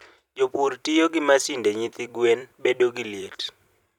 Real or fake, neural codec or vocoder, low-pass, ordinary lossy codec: fake; vocoder, 44.1 kHz, 128 mel bands every 256 samples, BigVGAN v2; 19.8 kHz; none